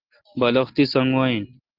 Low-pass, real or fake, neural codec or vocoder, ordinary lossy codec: 5.4 kHz; real; none; Opus, 16 kbps